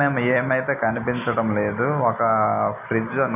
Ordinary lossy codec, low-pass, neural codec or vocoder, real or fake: none; 3.6 kHz; none; real